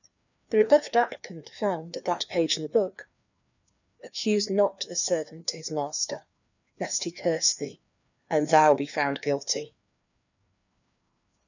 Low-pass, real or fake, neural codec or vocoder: 7.2 kHz; fake; codec, 16 kHz, 2 kbps, FreqCodec, larger model